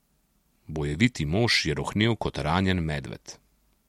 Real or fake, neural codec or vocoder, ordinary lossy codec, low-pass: real; none; MP3, 64 kbps; 19.8 kHz